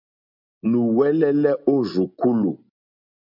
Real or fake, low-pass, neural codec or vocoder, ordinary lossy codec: real; 5.4 kHz; none; MP3, 48 kbps